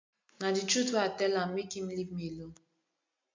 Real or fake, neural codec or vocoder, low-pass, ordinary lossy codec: real; none; 7.2 kHz; MP3, 64 kbps